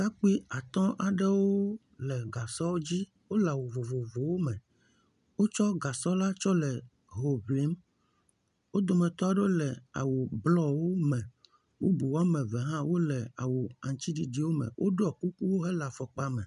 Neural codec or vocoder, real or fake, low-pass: none; real; 10.8 kHz